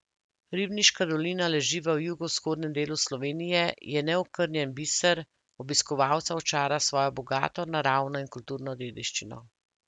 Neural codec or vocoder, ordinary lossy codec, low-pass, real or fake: none; none; 10.8 kHz; real